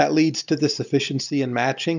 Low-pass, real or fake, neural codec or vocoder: 7.2 kHz; real; none